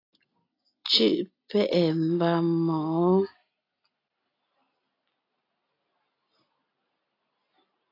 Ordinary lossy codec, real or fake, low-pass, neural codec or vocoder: AAC, 32 kbps; real; 5.4 kHz; none